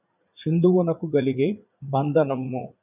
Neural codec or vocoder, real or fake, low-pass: vocoder, 44.1 kHz, 80 mel bands, Vocos; fake; 3.6 kHz